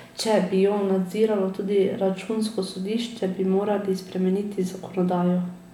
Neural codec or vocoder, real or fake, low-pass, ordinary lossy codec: none; real; 19.8 kHz; none